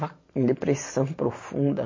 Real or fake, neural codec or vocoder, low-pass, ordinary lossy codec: real; none; 7.2 kHz; MP3, 32 kbps